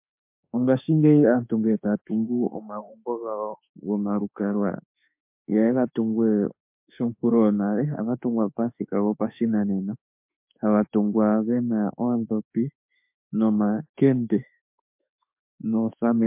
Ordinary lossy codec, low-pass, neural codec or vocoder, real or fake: MP3, 32 kbps; 3.6 kHz; autoencoder, 48 kHz, 32 numbers a frame, DAC-VAE, trained on Japanese speech; fake